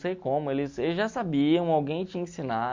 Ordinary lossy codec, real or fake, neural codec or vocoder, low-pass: MP3, 64 kbps; real; none; 7.2 kHz